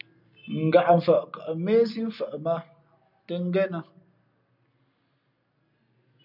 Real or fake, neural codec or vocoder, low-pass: real; none; 5.4 kHz